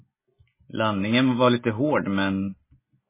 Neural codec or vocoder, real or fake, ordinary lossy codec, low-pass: none; real; MP3, 16 kbps; 3.6 kHz